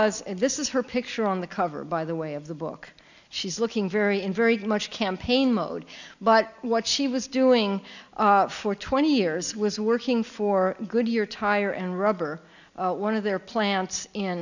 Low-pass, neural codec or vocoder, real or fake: 7.2 kHz; none; real